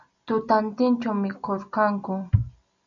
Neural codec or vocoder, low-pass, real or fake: none; 7.2 kHz; real